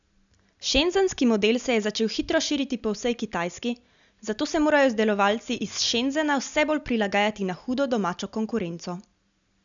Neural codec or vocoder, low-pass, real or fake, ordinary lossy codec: none; 7.2 kHz; real; none